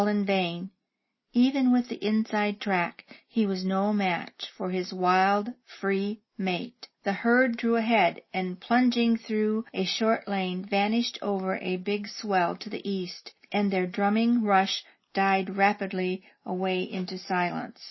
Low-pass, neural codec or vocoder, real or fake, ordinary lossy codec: 7.2 kHz; none; real; MP3, 24 kbps